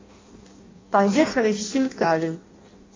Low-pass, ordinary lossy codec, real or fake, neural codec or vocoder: 7.2 kHz; AAC, 32 kbps; fake; codec, 16 kHz in and 24 kHz out, 0.6 kbps, FireRedTTS-2 codec